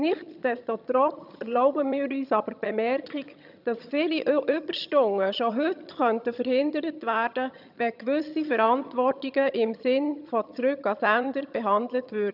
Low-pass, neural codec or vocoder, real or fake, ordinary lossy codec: 5.4 kHz; vocoder, 22.05 kHz, 80 mel bands, HiFi-GAN; fake; none